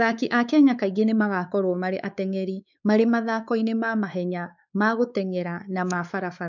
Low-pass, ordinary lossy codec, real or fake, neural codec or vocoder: 7.2 kHz; none; fake; codec, 16 kHz, 4 kbps, X-Codec, WavLM features, trained on Multilingual LibriSpeech